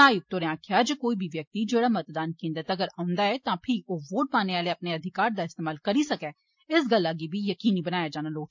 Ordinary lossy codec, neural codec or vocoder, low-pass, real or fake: MP3, 48 kbps; none; 7.2 kHz; real